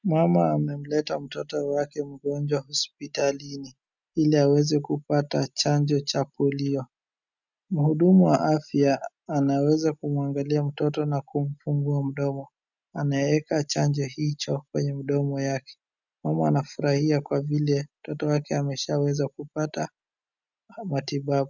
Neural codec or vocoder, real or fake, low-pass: none; real; 7.2 kHz